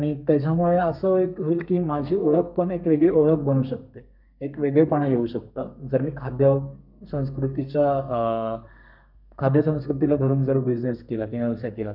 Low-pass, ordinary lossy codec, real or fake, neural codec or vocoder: 5.4 kHz; none; fake; codec, 32 kHz, 1.9 kbps, SNAC